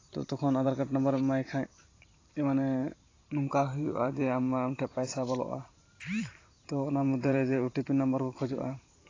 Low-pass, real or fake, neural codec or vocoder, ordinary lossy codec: 7.2 kHz; fake; vocoder, 44.1 kHz, 128 mel bands every 512 samples, BigVGAN v2; AAC, 32 kbps